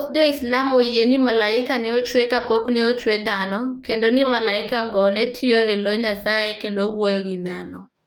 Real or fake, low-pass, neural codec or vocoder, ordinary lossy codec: fake; none; codec, 44.1 kHz, 2.6 kbps, DAC; none